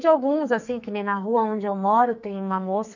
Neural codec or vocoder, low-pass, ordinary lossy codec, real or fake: codec, 44.1 kHz, 2.6 kbps, SNAC; 7.2 kHz; none; fake